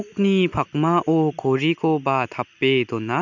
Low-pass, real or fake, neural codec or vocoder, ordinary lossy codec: 7.2 kHz; real; none; none